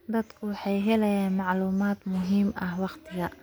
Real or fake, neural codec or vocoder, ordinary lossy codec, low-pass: real; none; none; none